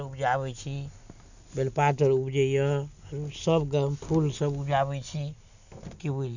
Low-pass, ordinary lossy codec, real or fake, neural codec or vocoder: 7.2 kHz; none; real; none